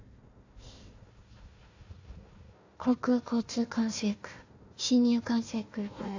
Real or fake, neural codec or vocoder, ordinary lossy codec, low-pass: fake; codec, 16 kHz, 1 kbps, FunCodec, trained on Chinese and English, 50 frames a second; AAC, 48 kbps; 7.2 kHz